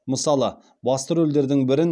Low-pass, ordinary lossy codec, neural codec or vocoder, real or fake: none; none; none; real